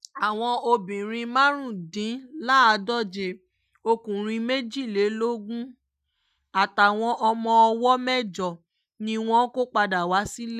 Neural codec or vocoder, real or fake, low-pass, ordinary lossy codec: none; real; 14.4 kHz; none